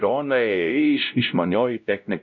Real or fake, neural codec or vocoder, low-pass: fake; codec, 16 kHz, 0.5 kbps, X-Codec, WavLM features, trained on Multilingual LibriSpeech; 7.2 kHz